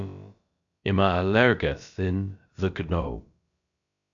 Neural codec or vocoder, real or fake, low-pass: codec, 16 kHz, about 1 kbps, DyCAST, with the encoder's durations; fake; 7.2 kHz